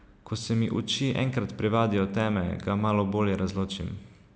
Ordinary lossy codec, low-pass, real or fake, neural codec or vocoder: none; none; real; none